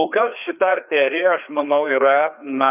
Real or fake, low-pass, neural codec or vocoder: fake; 3.6 kHz; codec, 16 kHz, 2 kbps, FreqCodec, larger model